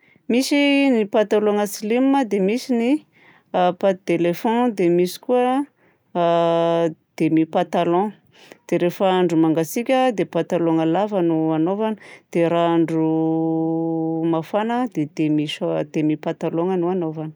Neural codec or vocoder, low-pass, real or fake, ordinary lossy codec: none; none; real; none